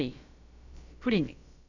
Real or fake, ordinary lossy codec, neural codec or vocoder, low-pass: fake; none; codec, 16 kHz, about 1 kbps, DyCAST, with the encoder's durations; 7.2 kHz